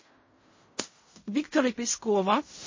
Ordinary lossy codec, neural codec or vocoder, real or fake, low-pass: MP3, 32 kbps; codec, 16 kHz in and 24 kHz out, 0.4 kbps, LongCat-Audio-Codec, fine tuned four codebook decoder; fake; 7.2 kHz